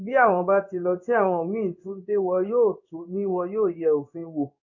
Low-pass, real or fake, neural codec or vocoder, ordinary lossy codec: 7.2 kHz; fake; codec, 16 kHz in and 24 kHz out, 1 kbps, XY-Tokenizer; none